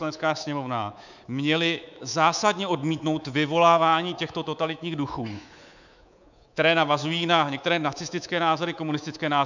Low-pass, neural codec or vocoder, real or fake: 7.2 kHz; codec, 24 kHz, 3.1 kbps, DualCodec; fake